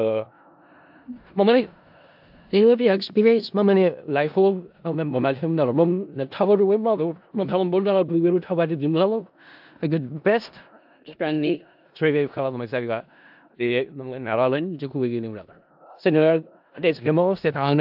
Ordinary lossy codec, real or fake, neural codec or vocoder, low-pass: AAC, 48 kbps; fake; codec, 16 kHz in and 24 kHz out, 0.4 kbps, LongCat-Audio-Codec, four codebook decoder; 5.4 kHz